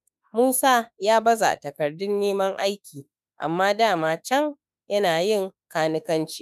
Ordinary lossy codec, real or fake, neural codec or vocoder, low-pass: none; fake; autoencoder, 48 kHz, 32 numbers a frame, DAC-VAE, trained on Japanese speech; 14.4 kHz